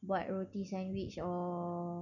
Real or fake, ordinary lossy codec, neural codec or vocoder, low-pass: real; none; none; 7.2 kHz